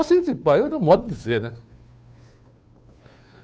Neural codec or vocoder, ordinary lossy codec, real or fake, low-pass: codec, 16 kHz, 2 kbps, FunCodec, trained on Chinese and English, 25 frames a second; none; fake; none